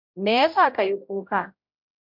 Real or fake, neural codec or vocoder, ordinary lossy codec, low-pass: fake; codec, 16 kHz, 0.5 kbps, X-Codec, HuBERT features, trained on general audio; MP3, 48 kbps; 5.4 kHz